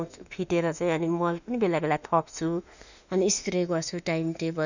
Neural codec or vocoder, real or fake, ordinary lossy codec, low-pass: autoencoder, 48 kHz, 32 numbers a frame, DAC-VAE, trained on Japanese speech; fake; none; 7.2 kHz